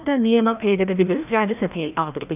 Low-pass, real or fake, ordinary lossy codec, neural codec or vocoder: 3.6 kHz; fake; none; codec, 16 kHz, 1 kbps, FreqCodec, larger model